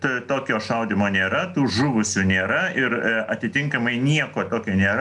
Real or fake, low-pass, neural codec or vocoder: real; 10.8 kHz; none